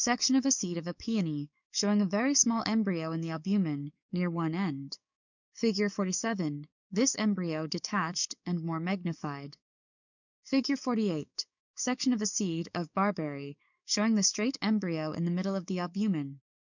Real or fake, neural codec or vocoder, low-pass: fake; codec, 44.1 kHz, 7.8 kbps, DAC; 7.2 kHz